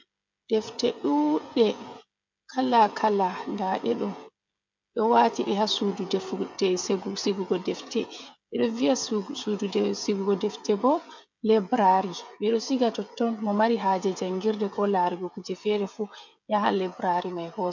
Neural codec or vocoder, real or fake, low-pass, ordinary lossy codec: codec, 16 kHz, 16 kbps, FreqCodec, smaller model; fake; 7.2 kHz; MP3, 64 kbps